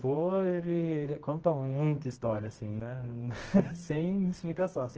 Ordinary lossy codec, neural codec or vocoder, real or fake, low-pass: Opus, 16 kbps; codec, 24 kHz, 0.9 kbps, WavTokenizer, medium music audio release; fake; 7.2 kHz